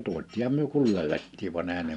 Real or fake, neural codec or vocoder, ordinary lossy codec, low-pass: real; none; none; 10.8 kHz